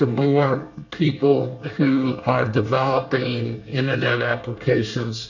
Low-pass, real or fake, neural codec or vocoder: 7.2 kHz; fake; codec, 24 kHz, 1 kbps, SNAC